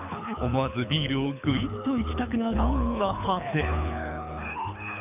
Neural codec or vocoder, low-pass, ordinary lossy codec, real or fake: codec, 24 kHz, 6 kbps, HILCodec; 3.6 kHz; none; fake